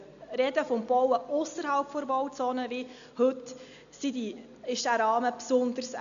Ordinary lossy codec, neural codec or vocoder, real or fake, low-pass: none; none; real; 7.2 kHz